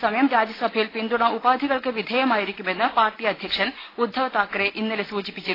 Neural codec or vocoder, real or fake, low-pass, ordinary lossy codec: none; real; 5.4 kHz; AAC, 24 kbps